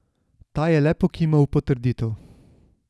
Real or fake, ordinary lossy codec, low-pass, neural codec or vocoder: real; none; none; none